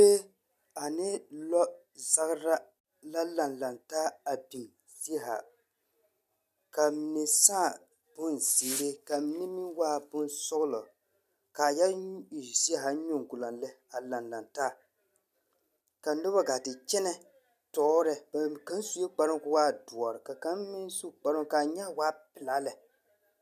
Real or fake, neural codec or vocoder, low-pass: real; none; 14.4 kHz